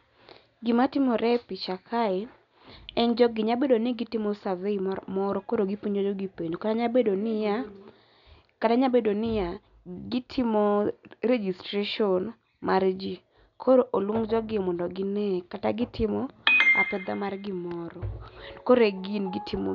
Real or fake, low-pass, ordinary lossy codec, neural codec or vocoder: real; 7.2 kHz; none; none